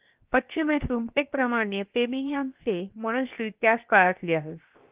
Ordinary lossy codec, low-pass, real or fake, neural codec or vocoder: Opus, 24 kbps; 3.6 kHz; fake; codec, 16 kHz, 0.7 kbps, FocalCodec